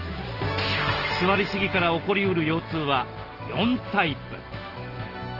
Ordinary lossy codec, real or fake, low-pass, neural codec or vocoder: Opus, 16 kbps; real; 5.4 kHz; none